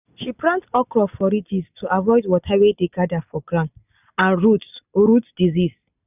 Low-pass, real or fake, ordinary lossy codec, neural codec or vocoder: 3.6 kHz; real; none; none